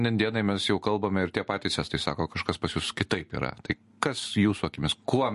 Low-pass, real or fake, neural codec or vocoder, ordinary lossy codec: 14.4 kHz; real; none; MP3, 48 kbps